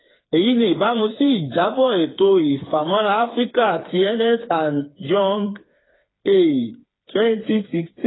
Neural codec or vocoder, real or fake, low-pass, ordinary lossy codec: codec, 16 kHz, 4 kbps, FreqCodec, smaller model; fake; 7.2 kHz; AAC, 16 kbps